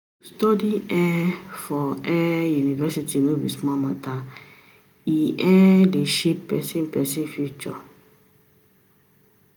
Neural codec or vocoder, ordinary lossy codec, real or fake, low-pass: none; none; real; none